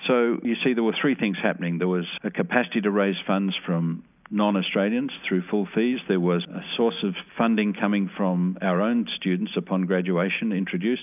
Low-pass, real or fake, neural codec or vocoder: 3.6 kHz; real; none